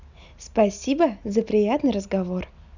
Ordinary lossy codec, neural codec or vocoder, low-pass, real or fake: none; none; 7.2 kHz; real